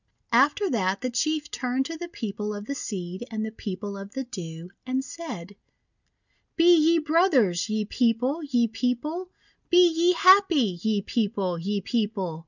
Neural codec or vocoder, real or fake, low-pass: none; real; 7.2 kHz